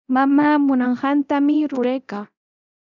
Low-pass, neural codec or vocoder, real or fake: 7.2 kHz; codec, 24 kHz, 0.9 kbps, DualCodec; fake